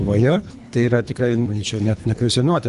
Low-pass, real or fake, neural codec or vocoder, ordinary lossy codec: 10.8 kHz; fake; codec, 24 kHz, 3 kbps, HILCodec; Opus, 64 kbps